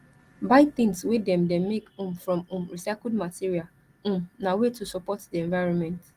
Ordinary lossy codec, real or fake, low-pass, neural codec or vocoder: Opus, 24 kbps; real; 14.4 kHz; none